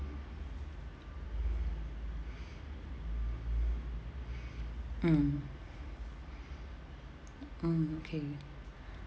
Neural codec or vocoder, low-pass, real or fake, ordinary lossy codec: none; none; real; none